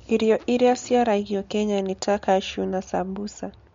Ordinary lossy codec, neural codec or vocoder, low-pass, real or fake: MP3, 48 kbps; none; 7.2 kHz; real